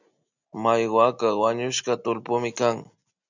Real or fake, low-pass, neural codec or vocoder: real; 7.2 kHz; none